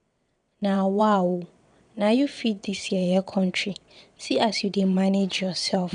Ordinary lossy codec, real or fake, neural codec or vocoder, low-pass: none; fake; vocoder, 22.05 kHz, 80 mel bands, WaveNeXt; 9.9 kHz